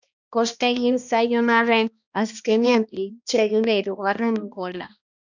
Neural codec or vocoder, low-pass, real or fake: codec, 16 kHz, 1 kbps, X-Codec, HuBERT features, trained on balanced general audio; 7.2 kHz; fake